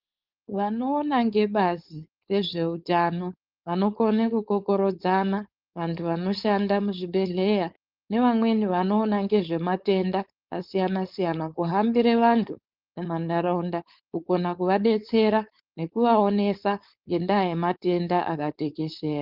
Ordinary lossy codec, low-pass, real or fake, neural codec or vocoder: Opus, 32 kbps; 5.4 kHz; fake; codec, 16 kHz, 4.8 kbps, FACodec